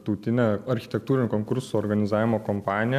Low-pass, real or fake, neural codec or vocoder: 14.4 kHz; real; none